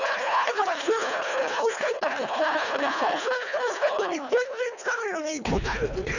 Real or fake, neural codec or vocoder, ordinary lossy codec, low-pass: fake; codec, 24 kHz, 1.5 kbps, HILCodec; none; 7.2 kHz